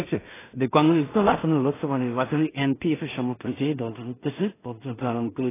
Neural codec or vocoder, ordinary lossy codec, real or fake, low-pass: codec, 16 kHz in and 24 kHz out, 0.4 kbps, LongCat-Audio-Codec, two codebook decoder; AAC, 16 kbps; fake; 3.6 kHz